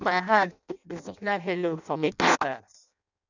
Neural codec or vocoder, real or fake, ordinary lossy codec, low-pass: codec, 16 kHz in and 24 kHz out, 0.6 kbps, FireRedTTS-2 codec; fake; none; 7.2 kHz